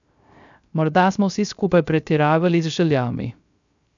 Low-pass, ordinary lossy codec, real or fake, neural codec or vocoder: 7.2 kHz; none; fake; codec, 16 kHz, 0.3 kbps, FocalCodec